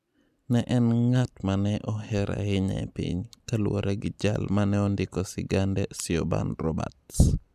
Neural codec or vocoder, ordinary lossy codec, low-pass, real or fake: none; none; 14.4 kHz; real